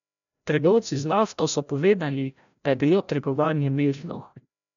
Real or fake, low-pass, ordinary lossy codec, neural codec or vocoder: fake; 7.2 kHz; none; codec, 16 kHz, 0.5 kbps, FreqCodec, larger model